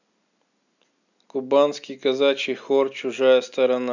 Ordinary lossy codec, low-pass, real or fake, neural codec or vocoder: none; 7.2 kHz; real; none